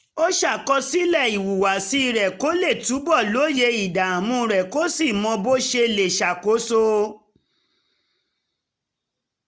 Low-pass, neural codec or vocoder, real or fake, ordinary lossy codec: none; none; real; none